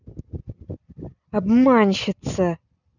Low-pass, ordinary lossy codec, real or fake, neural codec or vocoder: 7.2 kHz; none; real; none